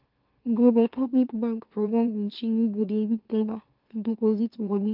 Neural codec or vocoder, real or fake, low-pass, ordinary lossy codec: autoencoder, 44.1 kHz, a latent of 192 numbers a frame, MeloTTS; fake; 5.4 kHz; Opus, 24 kbps